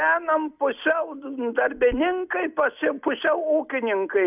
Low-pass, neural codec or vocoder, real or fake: 3.6 kHz; none; real